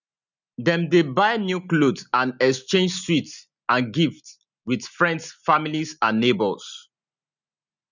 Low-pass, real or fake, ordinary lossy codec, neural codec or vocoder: 7.2 kHz; real; none; none